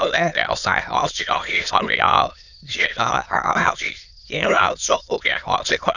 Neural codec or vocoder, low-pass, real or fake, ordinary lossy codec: autoencoder, 22.05 kHz, a latent of 192 numbers a frame, VITS, trained on many speakers; 7.2 kHz; fake; none